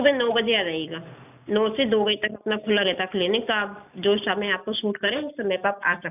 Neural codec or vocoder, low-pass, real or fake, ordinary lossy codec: none; 3.6 kHz; real; none